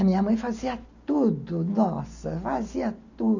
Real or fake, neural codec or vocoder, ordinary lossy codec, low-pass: real; none; none; 7.2 kHz